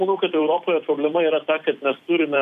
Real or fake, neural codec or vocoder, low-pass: fake; vocoder, 44.1 kHz, 128 mel bands every 256 samples, BigVGAN v2; 14.4 kHz